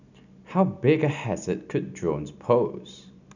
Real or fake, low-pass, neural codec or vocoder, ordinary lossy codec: real; 7.2 kHz; none; none